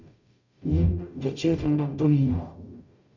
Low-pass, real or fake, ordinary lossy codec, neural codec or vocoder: 7.2 kHz; fake; none; codec, 44.1 kHz, 0.9 kbps, DAC